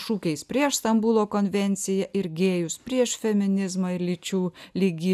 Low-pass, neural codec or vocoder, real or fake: 14.4 kHz; none; real